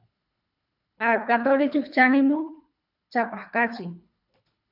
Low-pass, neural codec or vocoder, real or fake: 5.4 kHz; codec, 24 kHz, 3 kbps, HILCodec; fake